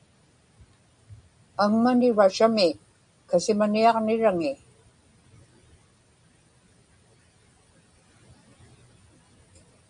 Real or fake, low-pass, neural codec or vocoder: real; 9.9 kHz; none